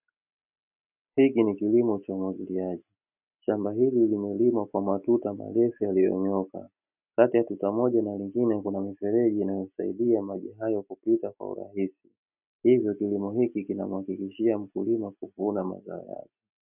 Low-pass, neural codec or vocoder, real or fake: 3.6 kHz; none; real